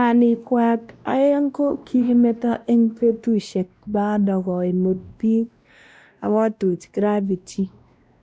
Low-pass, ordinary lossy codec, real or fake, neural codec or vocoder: none; none; fake; codec, 16 kHz, 1 kbps, X-Codec, WavLM features, trained on Multilingual LibriSpeech